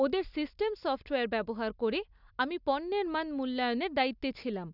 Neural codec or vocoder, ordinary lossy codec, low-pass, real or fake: none; none; 5.4 kHz; real